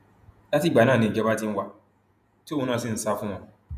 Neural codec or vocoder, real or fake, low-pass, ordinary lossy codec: vocoder, 48 kHz, 128 mel bands, Vocos; fake; 14.4 kHz; none